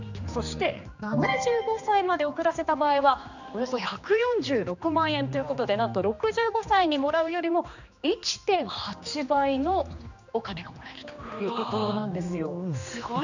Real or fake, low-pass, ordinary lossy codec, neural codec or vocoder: fake; 7.2 kHz; none; codec, 16 kHz, 2 kbps, X-Codec, HuBERT features, trained on general audio